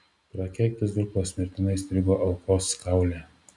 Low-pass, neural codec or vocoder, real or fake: 10.8 kHz; none; real